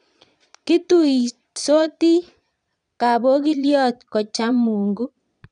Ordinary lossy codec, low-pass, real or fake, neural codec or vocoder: none; 9.9 kHz; fake; vocoder, 22.05 kHz, 80 mel bands, Vocos